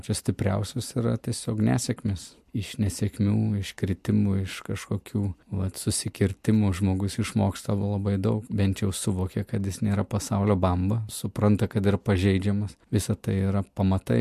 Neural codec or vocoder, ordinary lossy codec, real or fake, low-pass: none; MP3, 64 kbps; real; 14.4 kHz